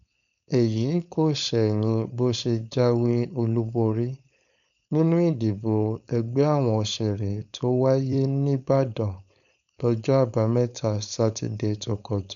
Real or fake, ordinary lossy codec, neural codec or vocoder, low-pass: fake; none; codec, 16 kHz, 4.8 kbps, FACodec; 7.2 kHz